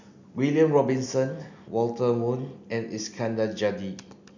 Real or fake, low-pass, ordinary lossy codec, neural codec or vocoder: real; 7.2 kHz; none; none